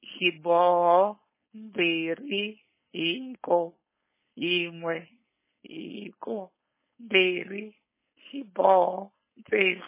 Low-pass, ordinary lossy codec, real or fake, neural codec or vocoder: 3.6 kHz; MP3, 16 kbps; fake; codec, 16 kHz, 4.8 kbps, FACodec